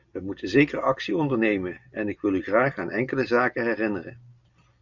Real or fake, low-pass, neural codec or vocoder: real; 7.2 kHz; none